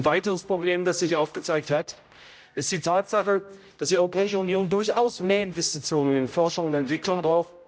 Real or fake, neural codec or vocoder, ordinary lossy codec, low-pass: fake; codec, 16 kHz, 0.5 kbps, X-Codec, HuBERT features, trained on general audio; none; none